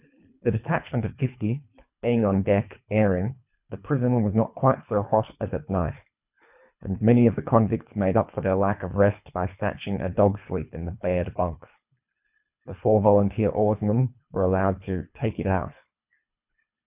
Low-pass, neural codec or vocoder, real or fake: 3.6 kHz; codec, 24 kHz, 3 kbps, HILCodec; fake